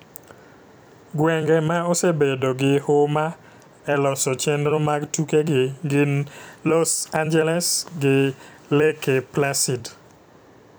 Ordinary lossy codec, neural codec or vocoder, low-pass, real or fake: none; vocoder, 44.1 kHz, 128 mel bands every 256 samples, BigVGAN v2; none; fake